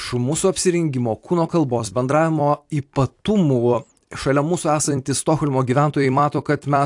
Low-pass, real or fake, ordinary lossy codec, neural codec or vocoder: 10.8 kHz; fake; AAC, 64 kbps; vocoder, 44.1 kHz, 128 mel bands every 256 samples, BigVGAN v2